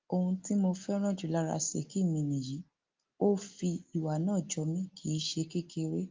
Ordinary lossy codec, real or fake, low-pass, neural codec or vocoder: Opus, 32 kbps; real; 7.2 kHz; none